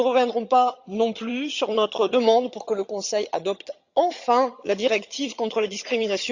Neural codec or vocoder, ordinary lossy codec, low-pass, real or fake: vocoder, 22.05 kHz, 80 mel bands, HiFi-GAN; Opus, 64 kbps; 7.2 kHz; fake